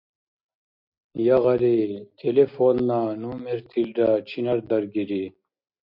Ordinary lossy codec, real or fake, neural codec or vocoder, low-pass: MP3, 48 kbps; real; none; 5.4 kHz